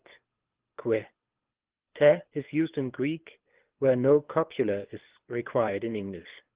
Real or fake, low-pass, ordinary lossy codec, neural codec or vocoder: fake; 3.6 kHz; Opus, 16 kbps; codec, 24 kHz, 6 kbps, HILCodec